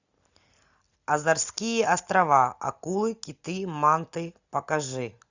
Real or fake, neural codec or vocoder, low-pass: real; none; 7.2 kHz